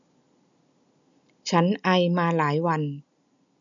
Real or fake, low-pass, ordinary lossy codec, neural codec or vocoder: real; 7.2 kHz; none; none